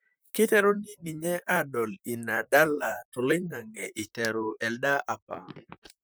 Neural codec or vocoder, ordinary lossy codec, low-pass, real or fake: vocoder, 44.1 kHz, 128 mel bands, Pupu-Vocoder; none; none; fake